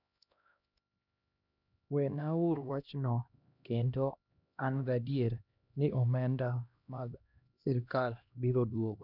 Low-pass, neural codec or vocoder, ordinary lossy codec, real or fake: 5.4 kHz; codec, 16 kHz, 1 kbps, X-Codec, HuBERT features, trained on LibriSpeech; none; fake